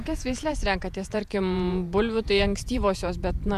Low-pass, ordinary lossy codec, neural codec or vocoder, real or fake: 14.4 kHz; MP3, 96 kbps; vocoder, 48 kHz, 128 mel bands, Vocos; fake